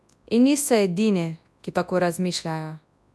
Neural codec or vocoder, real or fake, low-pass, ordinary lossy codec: codec, 24 kHz, 0.9 kbps, WavTokenizer, large speech release; fake; none; none